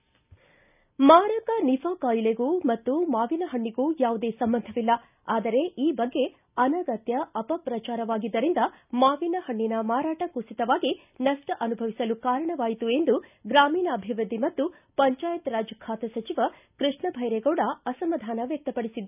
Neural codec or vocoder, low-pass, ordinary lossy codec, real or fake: none; 3.6 kHz; none; real